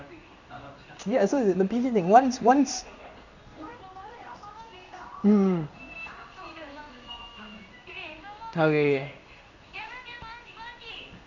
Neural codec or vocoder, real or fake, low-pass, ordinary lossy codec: codec, 16 kHz in and 24 kHz out, 1 kbps, XY-Tokenizer; fake; 7.2 kHz; none